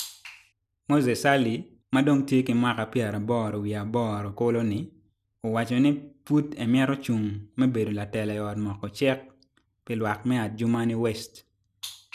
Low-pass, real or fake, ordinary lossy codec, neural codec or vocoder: 14.4 kHz; real; none; none